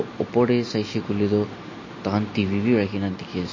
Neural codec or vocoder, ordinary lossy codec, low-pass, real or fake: none; MP3, 32 kbps; 7.2 kHz; real